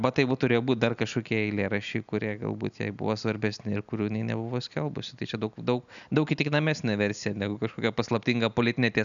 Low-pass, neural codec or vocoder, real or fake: 7.2 kHz; none; real